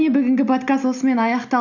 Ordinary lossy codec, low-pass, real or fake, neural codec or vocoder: none; 7.2 kHz; real; none